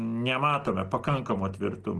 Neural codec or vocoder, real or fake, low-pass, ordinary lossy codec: none; real; 10.8 kHz; Opus, 16 kbps